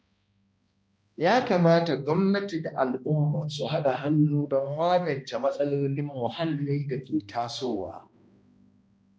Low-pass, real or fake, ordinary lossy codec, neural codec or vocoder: none; fake; none; codec, 16 kHz, 1 kbps, X-Codec, HuBERT features, trained on balanced general audio